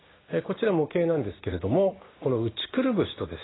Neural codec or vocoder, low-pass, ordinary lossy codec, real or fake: codec, 16 kHz, 6 kbps, DAC; 7.2 kHz; AAC, 16 kbps; fake